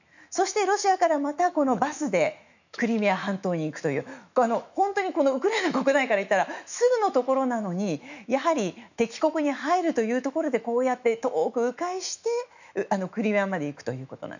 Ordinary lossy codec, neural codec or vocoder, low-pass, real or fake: none; autoencoder, 48 kHz, 128 numbers a frame, DAC-VAE, trained on Japanese speech; 7.2 kHz; fake